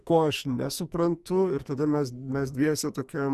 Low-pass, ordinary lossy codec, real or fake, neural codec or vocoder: 14.4 kHz; Opus, 64 kbps; fake; codec, 44.1 kHz, 2.6 kbps, SNAC